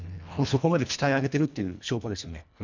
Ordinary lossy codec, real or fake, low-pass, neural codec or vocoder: none; fake; 7.2 kHz; codec, 24 kHz, 1.5 kbps, HILCodec